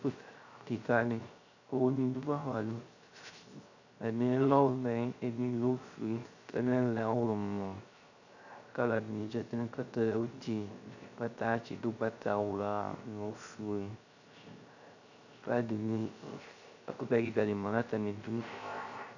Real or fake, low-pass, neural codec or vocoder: fake; 7.2 kHz; codec, 16 kHz, 0.3 kbps, FocalCodec